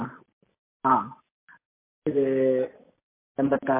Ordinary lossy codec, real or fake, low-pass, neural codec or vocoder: MP3, 24 kbps; real; 3.6 kHz; none